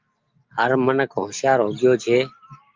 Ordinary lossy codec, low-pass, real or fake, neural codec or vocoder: Opus, 32 kbps; 7.2 kHz; real; none